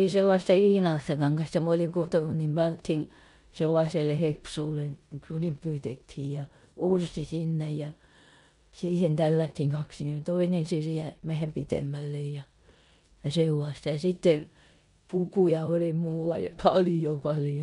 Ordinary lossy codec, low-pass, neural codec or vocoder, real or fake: none; 10.8 kHz; codec, 16 kHz in and 24 kHz out, 0.9 kbps, LongCat-Audio-Codec, four codebook decoder; fake